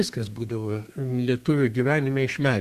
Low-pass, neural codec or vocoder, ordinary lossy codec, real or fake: 14.4 kHz; codec, 32 kHz, 1.9 kbps, SNAC; Opus, 64 kbps; fake